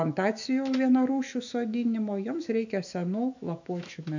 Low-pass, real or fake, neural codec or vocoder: 7.2 kHz; fake; autoencoder, 48 kHz, 128 numbers a frame, DAC-VAE, trained on Japanese speech